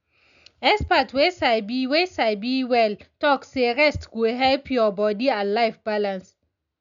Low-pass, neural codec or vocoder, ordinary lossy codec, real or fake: 7.2 kHz; none; none; real